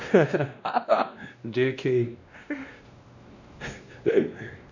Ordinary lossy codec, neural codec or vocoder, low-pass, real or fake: none; codec, 16 kHz, 1 kbps, X-Codec, WavLM features, trained on Multilingual LibriSpeech; 7.2 kHz; fake